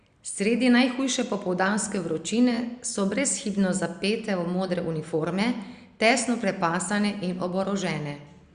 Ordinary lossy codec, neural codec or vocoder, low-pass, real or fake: Opus, 64 kbps; none; 9.9 kHz; real